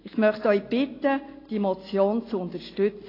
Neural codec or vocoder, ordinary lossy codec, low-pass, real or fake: none; AAC, 24 kbps; 5.4 kHz; real